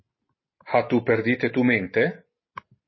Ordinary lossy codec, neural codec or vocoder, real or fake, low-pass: MP3, 24 kbps; none; real; 7.2 kHz